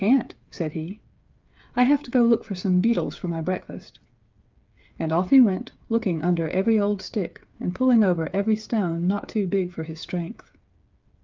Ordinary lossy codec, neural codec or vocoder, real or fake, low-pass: Opus, 24 kbps; codec, 16 kHz, 8 kbps, FreqCodec, smaller model; fake; 7.2 kHz